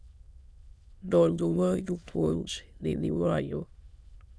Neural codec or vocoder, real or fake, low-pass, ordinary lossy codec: autoencoder, 22.05 kHz, a latent of 192 numbers a frame, VITS, trained on many speakers; fake; none; none